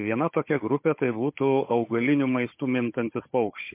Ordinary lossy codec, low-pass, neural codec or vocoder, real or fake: MP3, 24 kbps; 3.6 kHz; codec, 16 kHz, 16 kbps, FunCodec, trained on Chinese and English, 50 frames a second; fake